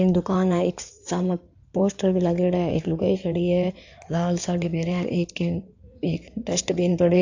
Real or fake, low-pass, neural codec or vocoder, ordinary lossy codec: fake; 7.2 kHz; codec, 16 kHz in and 24 kHz out, 2.2 kbps, FireRedTTS-2 codec; AAC, 48 kbps